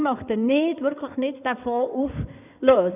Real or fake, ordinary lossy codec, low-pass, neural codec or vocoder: fake; none; 3.6 kHz; codec, 16 kHz in and 24 kHz out, 2.2 kbps, FireRedTTS-2 codec